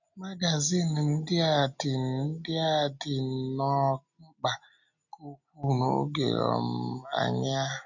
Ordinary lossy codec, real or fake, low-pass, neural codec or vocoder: none; real; 7.2 kHz; none